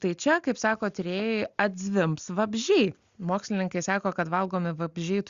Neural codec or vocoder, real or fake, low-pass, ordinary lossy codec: none; real; 7.2 kHz; Opus, 64 kbps